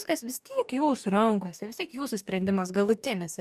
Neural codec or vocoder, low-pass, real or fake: codec, 44.1 kHz, 2.6 kbps, DAC; 14.4 kHz; fake